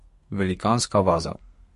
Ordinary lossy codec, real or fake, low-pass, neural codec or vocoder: MP3, 48 kbps; fake; 14.4 kHz; codec, 32 kHz, 1.9 kbps, SNAC